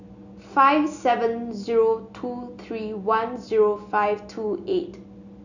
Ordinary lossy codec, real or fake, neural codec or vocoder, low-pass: none; real; none; 7.2 kHz